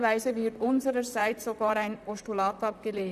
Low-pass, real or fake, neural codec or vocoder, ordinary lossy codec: 14.4 kHz; fake; vocoder, 44.1 kHz, 128 mel bands, Pupu-Vocoder; none